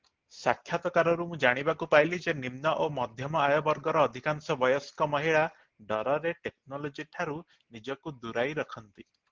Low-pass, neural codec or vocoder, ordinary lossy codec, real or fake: 7.2 kHz; none; Opus, 16 kbps; real